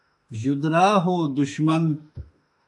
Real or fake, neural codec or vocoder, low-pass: fake; autoencoder, 48 kHz, 32 numbers a frame, DAC-VAE, trained on Japanese speech; 10.8 kHz